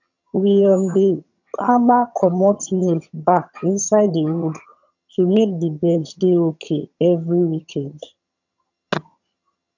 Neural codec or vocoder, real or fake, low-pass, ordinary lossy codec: vocoder, 22.05 kHz, 80 mel bands, HiFi-GAN; fake; 7.2 kHz; none